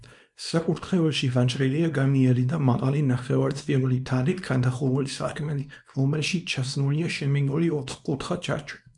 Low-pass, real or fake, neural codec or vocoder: 10.8 kHz; fake; codec, 24 kHz, 0.9 kbps, WavTokenizer, small release